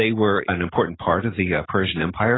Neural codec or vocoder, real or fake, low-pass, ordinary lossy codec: none; real; 7.2 kHz; AAC, 16 kbps